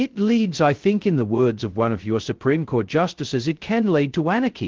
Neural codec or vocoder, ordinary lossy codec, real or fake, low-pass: codec, 16 kHz, 0.2 kbps, FocalCodec; Opus, 16 kbps; fake; 7.2 kHz